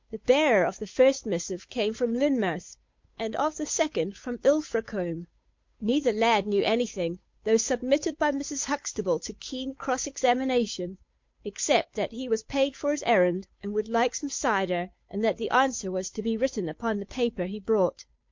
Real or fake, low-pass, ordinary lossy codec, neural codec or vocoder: fake; 7.2 kHz; MP3, 48 kbps; codec, 16 kHz, 8 kbps, FunCodec, trained on Chinese and English, 25 frames a second